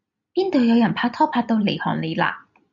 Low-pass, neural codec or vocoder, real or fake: 7.2 kHz; none; real